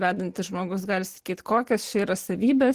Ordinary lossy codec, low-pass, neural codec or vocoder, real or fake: Opus, 16 kbps; 14.4 kHz; none; real